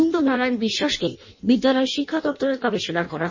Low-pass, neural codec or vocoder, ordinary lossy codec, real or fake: 7.2 kHz; codec, 16 kHz in and 24 kHz out, 0.6 kbps, FireRedTTS-2 codec; MP3, 32 kbps; fake